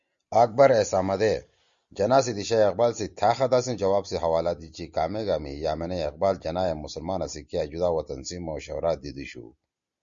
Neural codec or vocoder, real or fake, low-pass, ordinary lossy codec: none; real; 7.2 kHz; Opus, 64 kbps